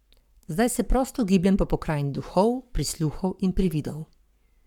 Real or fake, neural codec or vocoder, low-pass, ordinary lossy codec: fake; codec, 44.1 kHz, 7.8 kbps, Pupu-Codec; 19.8 kHz; none